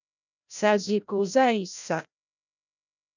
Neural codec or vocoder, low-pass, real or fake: codec, 16 kHz, 0.5 kbps, FreqCodec, larger model; 7.2 kHz; fake